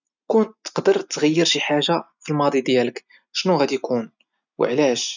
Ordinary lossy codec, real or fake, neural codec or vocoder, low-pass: none; real; none; 7.2 kHz